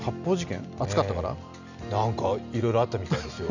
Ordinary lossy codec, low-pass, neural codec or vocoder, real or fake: none; 7.2 kHz; none; real